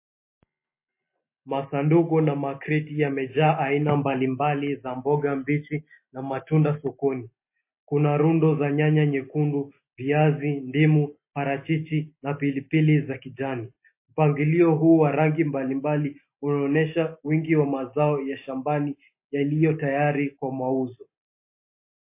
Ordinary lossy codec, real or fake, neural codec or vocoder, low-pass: MP3, 24 kbps; real; none; 3.6 kHz